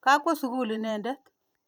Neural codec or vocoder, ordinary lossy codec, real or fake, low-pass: vocoder, 44.1 kHz, 128 mel bands every 512 samples, BigVGAN v2; none; fake; none